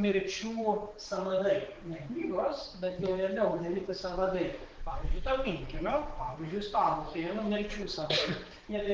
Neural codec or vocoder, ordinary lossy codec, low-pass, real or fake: codec, 16 kHz, 4 kbps, X-Codec, HuBERT features, trained on balanced general audio; Opus, 16 kbps; 7.2 kHz; fake